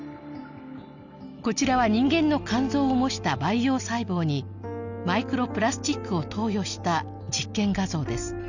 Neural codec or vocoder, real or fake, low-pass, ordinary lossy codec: none; real; 7.2 kHz; none